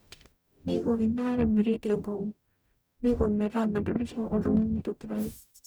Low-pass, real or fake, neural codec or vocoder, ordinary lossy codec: none; fake; codec, 44.1 kHz, 0.9 kbps, DAC; none